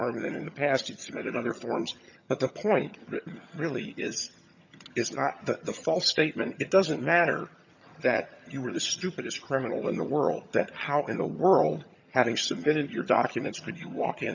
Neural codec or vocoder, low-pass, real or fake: vocoder, 22.05 kHz, 80 mel bands, HiFi-GAN; 7.2 kHz; fake